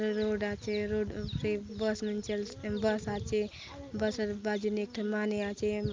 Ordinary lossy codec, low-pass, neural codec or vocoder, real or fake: Opus, 24 kbps; 7.2 kHz; none; real